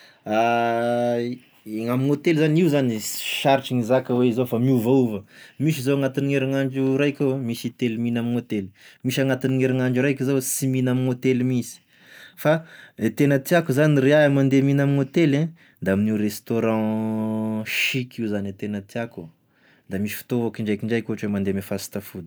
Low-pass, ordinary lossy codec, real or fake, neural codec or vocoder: none; none; real; none